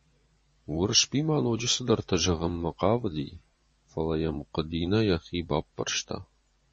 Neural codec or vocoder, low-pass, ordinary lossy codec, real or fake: vocoder, 24 kHz, 100 mel bands, Vocos; 10.8 kHz; MP3, 32 kbps; fake